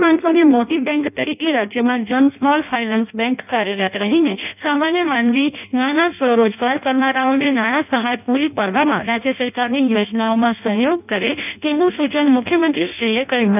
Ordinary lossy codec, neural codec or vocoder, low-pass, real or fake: none; codec, 16 kHz in and 24 kHz out, 0.6 kbps, FireRedTTS-2 codec; 3.6 kHz; fake